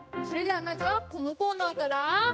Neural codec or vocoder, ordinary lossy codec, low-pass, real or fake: codec, 16 kHz, 1 kbps, X-Codec, HuBERT features, trained on general audio; none; none; fake